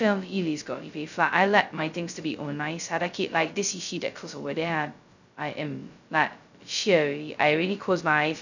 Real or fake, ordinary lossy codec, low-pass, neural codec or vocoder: fake; none; 7.2 kHz; codec, 16 kHz, 0.2 kbps, FocalCodec